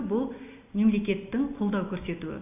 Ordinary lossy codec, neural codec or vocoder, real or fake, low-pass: none; none; real; 3.6 kHz